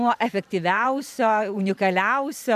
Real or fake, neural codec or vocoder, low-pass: fake; vocoder, 44.1 kHz, 128 mel bands every 512 samples, BigVGAN v2; 14.4 kHz